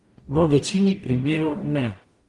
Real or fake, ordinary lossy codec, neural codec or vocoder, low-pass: fake; Opus, 24 kbps; codec, 44.1 kHz, 0.9 kbps, DAC; 10.8 kHz